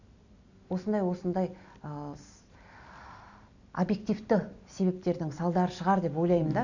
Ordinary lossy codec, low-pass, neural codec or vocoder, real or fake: none; 7.2 kHz; none; real